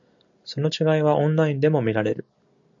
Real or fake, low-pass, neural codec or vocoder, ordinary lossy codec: real; 7.2 kHz; none; AAC, 64 kbps